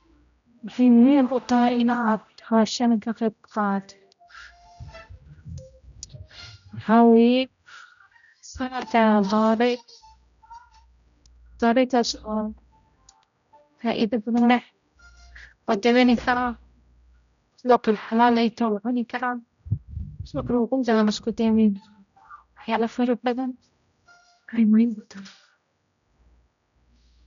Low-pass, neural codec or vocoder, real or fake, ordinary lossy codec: 7.2 kHz; codec, 16 kHz, 0.5 kbps, X-Codec, HuBERT features, trained on general audio; fake; none